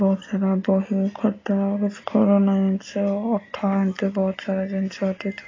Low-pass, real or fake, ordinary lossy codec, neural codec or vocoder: 7.2 kHz; real; AAC, 32 kbps; none